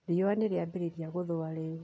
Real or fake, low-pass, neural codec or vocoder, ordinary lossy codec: real; none; none; none